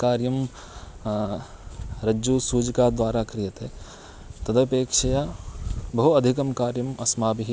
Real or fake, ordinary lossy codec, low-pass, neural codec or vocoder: real; none; none; none